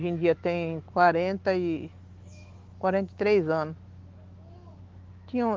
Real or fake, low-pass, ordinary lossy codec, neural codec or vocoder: fake; 7.2 kHz; Opus, 16 kbps; autoencoder, 48 kHz, 128 numbers a frame, DAC-VAE, trained on Japanese speech